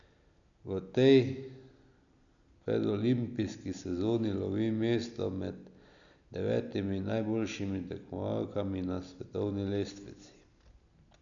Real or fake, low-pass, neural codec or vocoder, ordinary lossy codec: real; 7.2 kHz; none; none